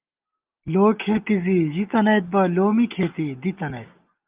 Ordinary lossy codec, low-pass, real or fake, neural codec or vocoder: Opus, 32 kbps; 3.6 kHz; real; none